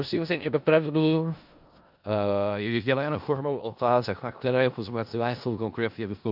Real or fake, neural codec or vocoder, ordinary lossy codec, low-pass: fake; codec, 16 kHz in and 24 kHz out, 0.4 kbps, LongCat-Audio-Codec, four codebook decoder; AAC, 48 kbps; 5.4 kHz